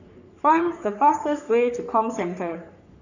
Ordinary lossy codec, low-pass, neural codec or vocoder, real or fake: none; 7.2 kHz; codec, 44.1 kHz, 3.4 kbps, Pupu-Codec; fake